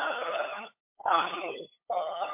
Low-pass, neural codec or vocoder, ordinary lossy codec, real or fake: 3.6 kHz; codec, 16 kHz, 8 kbps, FunCodec, trained on LibriTTS, 25 frames a second; MP3, 24 kbps; fake